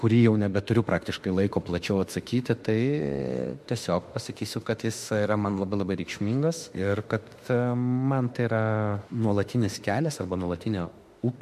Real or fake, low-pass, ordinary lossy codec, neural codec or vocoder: fake; 14.4 kHz; MP3, 64 kbps; autoencoder, 48 kHz, 32 numbers a frame, DAC-VAE, trained on Japanese speech